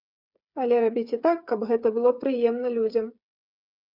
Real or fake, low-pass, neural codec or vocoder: fake; 5.4 kHz; codec, 16 kHz, 8 kbps, FreqCodec, smaller model